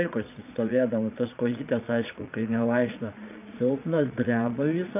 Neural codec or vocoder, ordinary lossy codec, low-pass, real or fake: vocoder, 22.05 kHz, 80 mel bands, Vocos; AAC, 32 kbps; 3.6 kHz; fake